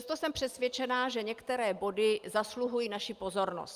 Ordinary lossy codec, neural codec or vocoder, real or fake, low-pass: Opus, 32 kbps; none; real; 14.4 kHz